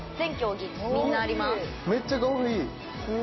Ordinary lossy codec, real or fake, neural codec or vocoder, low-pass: MP3, 24 kbps; real; none; 7.2 kHz